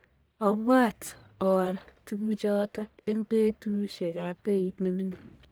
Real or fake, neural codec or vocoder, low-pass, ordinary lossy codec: fake; codec, 44.1 kHz, 1.7 kbps, Pupu-Codec; none; none